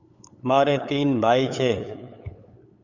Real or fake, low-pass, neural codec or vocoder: fake; 7.2 kHz; codec, 16 kHz, 8 kbps, FunCodec, trained on LibriTTS, 25 frames a second